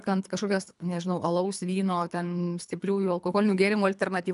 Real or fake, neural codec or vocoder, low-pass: fake; codec, 24 kHz, 3 kbps, HILCodec; 10.8 kHz